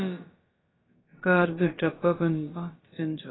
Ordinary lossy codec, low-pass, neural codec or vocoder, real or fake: AAC, 16 kbps; 7.2 kHz; codec, 16 kHz, about 1 kbps, DyCAST, with the encoder's durations; fake